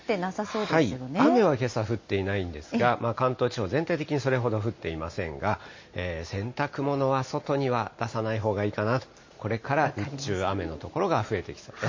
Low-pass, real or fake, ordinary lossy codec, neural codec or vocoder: 7.2 kHz; real; MP3, 32 kbps; none